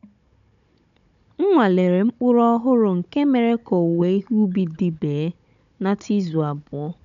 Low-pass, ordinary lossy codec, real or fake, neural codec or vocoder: 7.2 kHz; none; fake; codec, 16 kHz, 16 kbps, FunCodec, trained on Chinese and English, 50 frames a second